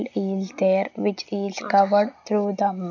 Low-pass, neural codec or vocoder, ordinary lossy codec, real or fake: 7.2 kHz; none; none; real